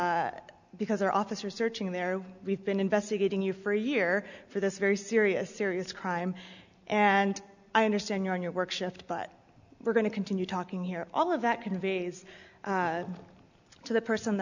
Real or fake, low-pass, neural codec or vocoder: real; 7.2 kHz; none